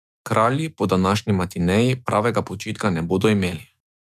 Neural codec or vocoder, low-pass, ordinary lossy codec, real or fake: autoencoder, 48 kHz, 128 numbers a frame, DAC-VAE, trained on Japanese speech; 14.4 kHz; none; fake